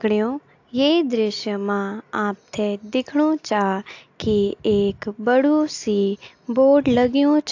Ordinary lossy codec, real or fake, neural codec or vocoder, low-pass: AAC, 48 kbps; real; none; 7.2 kHz